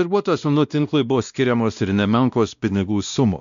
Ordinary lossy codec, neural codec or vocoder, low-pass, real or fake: MP3, 96 kbps; codec, 16 kHz, 1 kbps, X-Codec, WavLM features, trained on Multilingual LibriSpeech; 7.2 kHz; fake